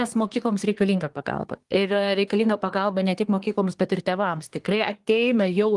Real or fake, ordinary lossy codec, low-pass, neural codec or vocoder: fake; Opus, 24 kbps; 10.8 kHz; codec, 24 kHz, 1 kbps, SNAC